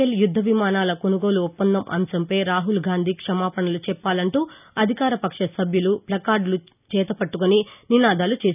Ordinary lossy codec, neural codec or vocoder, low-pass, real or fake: none; none; 3.6 kHz; real